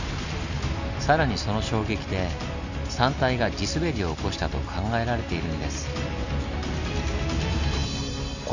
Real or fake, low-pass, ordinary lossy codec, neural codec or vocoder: real; 7.2 kHz; none; none